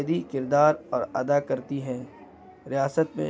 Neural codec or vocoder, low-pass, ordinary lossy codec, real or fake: none; none; none; real